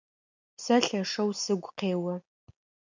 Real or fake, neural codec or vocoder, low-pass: real; none; 7.2 kHz